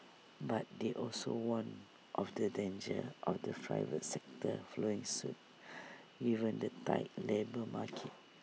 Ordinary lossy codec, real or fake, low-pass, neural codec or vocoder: none; real; none; none